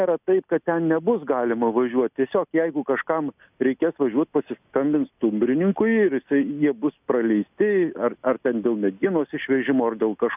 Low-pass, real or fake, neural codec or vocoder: 3.6 kHz; real; none